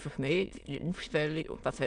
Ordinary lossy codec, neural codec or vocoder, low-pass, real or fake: none; autoencoder, 22.05 kHz, a latent of 192 numbers a frame, VITS, trained on many speakers; 9.9 kHz; fake